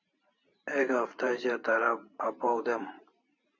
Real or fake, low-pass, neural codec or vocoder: fake; 7.2 kHz; vocoder, 44.1 kHz, 128 mel bands every 512 samples, BigVGAN v2